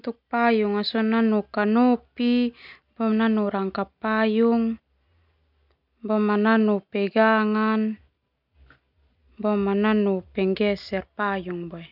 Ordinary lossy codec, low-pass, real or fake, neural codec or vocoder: none; 5.4 kHz; real; none